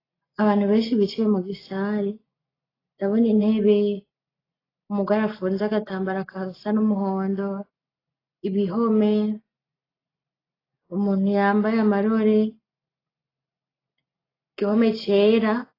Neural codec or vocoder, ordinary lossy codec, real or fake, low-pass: none; AAC, 24 kbps; real; 5.4 kHz